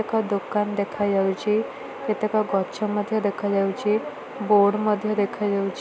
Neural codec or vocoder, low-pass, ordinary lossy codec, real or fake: none; none; none; real